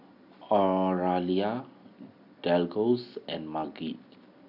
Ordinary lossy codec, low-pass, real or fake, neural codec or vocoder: none; 5.4 kHz; real; none